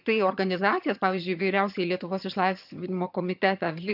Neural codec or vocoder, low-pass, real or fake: vocoder, 22.05 kHz, 80 mel bands, HiFi-GAN; 5.4 kHz; fake